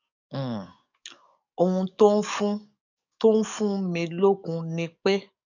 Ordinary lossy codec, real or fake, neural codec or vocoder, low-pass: none; fake; codec, 44.1 kHz, 7.8 kbps, DAC; 7.2 kHz